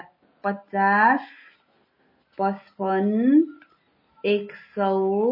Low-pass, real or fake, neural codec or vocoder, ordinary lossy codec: 5.4 kHz; real; none; MP3, 24 kbps